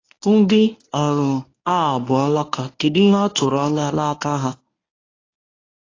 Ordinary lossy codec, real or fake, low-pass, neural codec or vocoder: AAC, 32 kbps; fake; 7.2 kHz; codec, 24 kHz, 0.9 kbps, WavTokenizer, medium speech release version 1